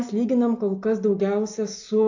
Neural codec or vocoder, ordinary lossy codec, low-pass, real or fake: none; AAC, 48 kbps; 7.2 kHz; real